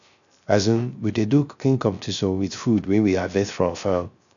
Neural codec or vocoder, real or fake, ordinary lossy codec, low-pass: codec, 16 kHz, 0.3 kbps, FocalCodec; fake; none; 7.2 kHz